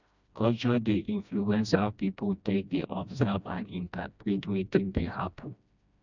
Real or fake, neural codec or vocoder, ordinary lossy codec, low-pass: fake; codec, 16 kHz, 1 kbps, FreqCodec, smaller model; none; 7.2 kHz